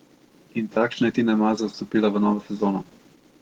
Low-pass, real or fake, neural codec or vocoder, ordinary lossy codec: 19.8 kHz; real; none; Opus, 16 kbps